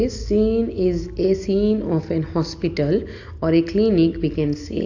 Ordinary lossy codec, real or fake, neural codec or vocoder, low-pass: AAC, 48 kbps; real; none; 7.2 kHz